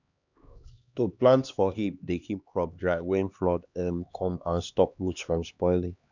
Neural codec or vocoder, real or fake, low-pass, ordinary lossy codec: codec, 16 kHz, 2 kbps, X-Codec, HuBERT features, trained on LibriSpeech; fake; 7.2 kHz; none